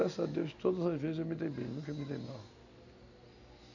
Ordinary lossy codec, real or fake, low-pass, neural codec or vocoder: none; real; 7.2 kHz; none